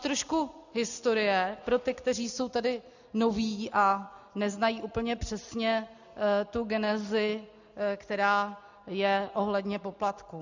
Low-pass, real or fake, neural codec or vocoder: 7.2 kHz; real; none